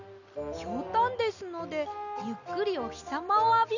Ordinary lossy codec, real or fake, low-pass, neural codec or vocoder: none; real; 7.2 kHz; none